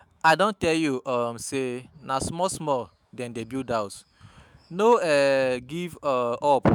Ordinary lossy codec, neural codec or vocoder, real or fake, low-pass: none; autoencoder, 48 kHz, 128 numbers a frame, DAC-VAE, trained on Japanese speech; fake; none